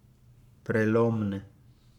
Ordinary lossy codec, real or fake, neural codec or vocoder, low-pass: none; fake; codec, 44.1 kHz, 7.8 kbps, Pupu-Codec; 19.8 kHz